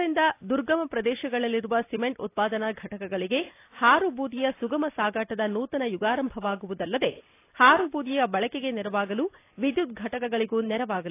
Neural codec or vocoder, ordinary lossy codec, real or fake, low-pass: none; AAC, 24 kbps; real; 3.6 kHz